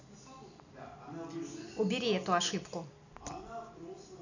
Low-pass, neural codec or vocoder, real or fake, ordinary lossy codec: 7.2 kHz; none; real; none